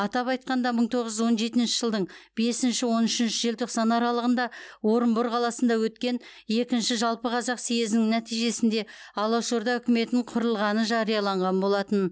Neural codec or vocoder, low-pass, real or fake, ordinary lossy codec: none; none; real; none